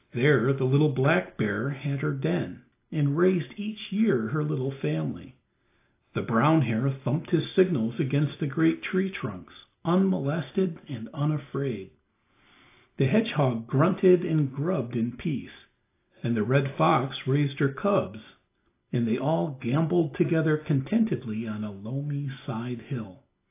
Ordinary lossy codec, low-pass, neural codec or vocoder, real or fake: AAC, 24 kbps; 3.6 kHz; none; real